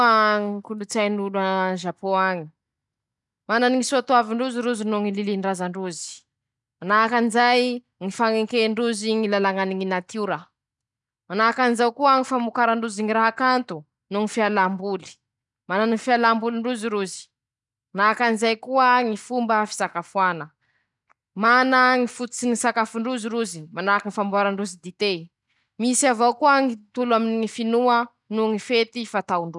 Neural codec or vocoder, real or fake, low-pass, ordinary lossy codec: none; real; 10.8 kHz; none